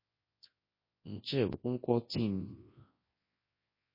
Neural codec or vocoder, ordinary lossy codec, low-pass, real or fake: codec, 24 kHz, 0.9 kbps, WavTokenizer, large speech release; MP3, 24 kbps; 5.4 kHz; fake